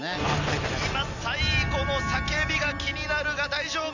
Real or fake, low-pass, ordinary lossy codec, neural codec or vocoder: real; 7.2 kHz; none; none